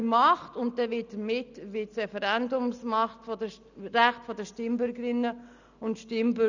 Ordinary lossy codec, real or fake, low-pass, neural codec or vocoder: none; real; 7.2 kHz; none